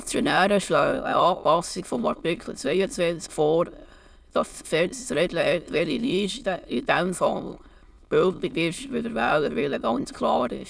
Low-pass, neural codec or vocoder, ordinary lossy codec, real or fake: none; autoencoder, 22.05 kHz, a latent of 192 numbers a frame, VITS, trained on many speakers; none; fake